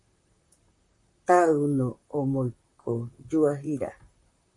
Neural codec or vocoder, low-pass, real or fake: vocoder, 44.1 kHz, 128 mel bands, Pupu-Vocoder; 10.8 kHz; fake